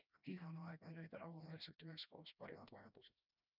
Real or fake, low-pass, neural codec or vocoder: fake; 5.4 kHz; codec, 16 kHz, 1 kbps, FreqCodec, smaller model